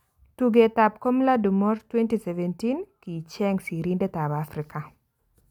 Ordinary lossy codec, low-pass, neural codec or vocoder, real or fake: none; 19.8 kHz; none; real